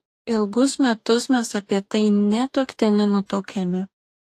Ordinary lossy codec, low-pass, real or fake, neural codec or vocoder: AAC, 64 kbps; 14.4 kHz; fake; codec, 44.1 kHz, 2.6 kbps, DAC